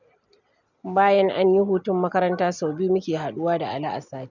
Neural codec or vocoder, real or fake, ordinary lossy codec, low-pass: none; real; none; 7.2 kHz